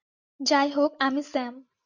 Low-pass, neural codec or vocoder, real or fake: 7.2 kHz; none; real